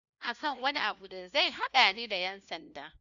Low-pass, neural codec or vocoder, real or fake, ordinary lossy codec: 7.2 kHz; codec, 16 kHz, 1 kbps, FunCodec, trained on LibriTTS, 50 frames a second; fake; none